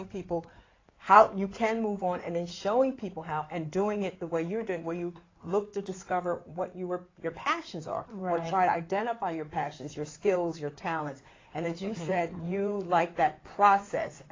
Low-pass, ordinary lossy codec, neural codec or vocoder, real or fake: 7.2 kHz; AAC, 32 kbps; codec, 16 kHz in and 24 kHz out, 2.2 kbps, FireRedTTS-2 codec; fake